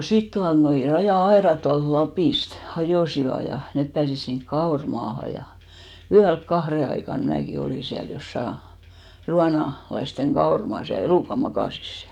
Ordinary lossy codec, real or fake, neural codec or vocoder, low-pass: none; fake; codec, 44.1 kHz, 7.8 kbps, DAC; 19.8 kHz